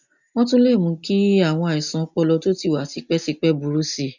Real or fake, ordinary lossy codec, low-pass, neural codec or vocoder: real; none; 7.2 kHz; none